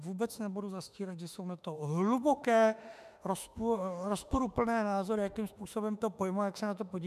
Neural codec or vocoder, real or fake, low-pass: autoencoder, 48 kHz, 32 numbers a frame, DAC-VAE, trained on Japanese speech; fake; 14.4 kHz